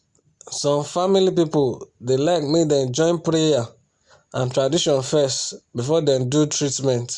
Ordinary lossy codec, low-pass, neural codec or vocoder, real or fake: Opus, 64 kbps; 10.8 kHz; none; real